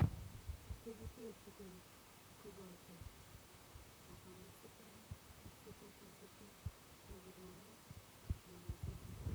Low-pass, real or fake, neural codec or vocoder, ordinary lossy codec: none; fake; vocoder, 44.1 kHz, 128 mel bands, Pupu-Vocoder; none